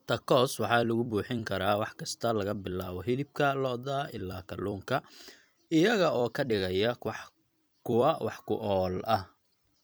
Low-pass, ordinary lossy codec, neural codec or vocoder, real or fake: none; none; vocoder, 44.1 kHz, 128 mel bands every 256 samples, BigVGAN v2; fake